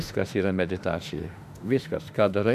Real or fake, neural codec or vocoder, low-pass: fake; autoencoder, 48 kHz, 32 numbers a frame, DAC-VAE, trained on Japanese speech; 14.4 kHz